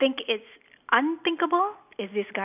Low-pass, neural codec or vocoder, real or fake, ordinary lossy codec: 3.6 kHz; none; real; none